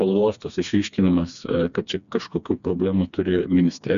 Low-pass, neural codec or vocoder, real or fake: 7.2 kHz; codec, 16 kHz, 2 kbps, FreqCodec, smaller model; fake